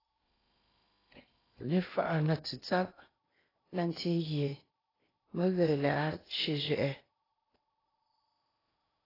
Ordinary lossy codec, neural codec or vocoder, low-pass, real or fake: AAC, 24 kbps; codec, 16 kHz in and 24 kHz out, 0.8 kbps, FocalCodec, streaming, 65536 codes; 5.4 kHz; fake